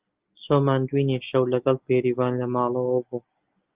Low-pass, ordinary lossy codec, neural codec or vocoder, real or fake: 3.6 kHz; Opus, 24 kbps; none; real